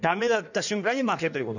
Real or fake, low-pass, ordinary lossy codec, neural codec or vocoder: fake; 7.2 kHz; none; codec, 16 kHz in and 24 kHz out, 1.1 kbps, FireRedTTS-2 codec